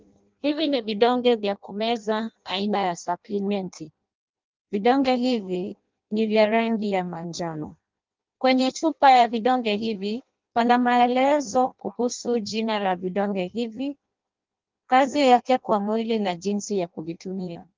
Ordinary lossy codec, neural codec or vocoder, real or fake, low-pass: Opus, 32 kbps; codec, 16 kHz in and 24 kHz out, 0.6 kbps, FireRedTTS-2 codec; fake; 7.2 kHz